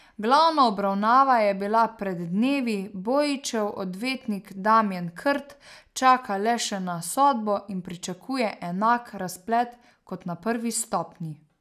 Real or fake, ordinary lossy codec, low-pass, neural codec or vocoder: real; none; 14.4 kHz; none